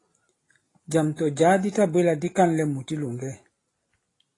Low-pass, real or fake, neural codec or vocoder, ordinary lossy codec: 10.8 kHz; real; none; AAC, 32 kbps